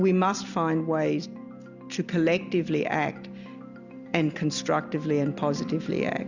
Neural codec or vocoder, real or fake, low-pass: none; real; 7.2 kHz